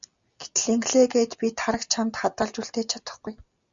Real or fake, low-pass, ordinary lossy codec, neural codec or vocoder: real; 7.2 kHz; Opus, 64 kbps; none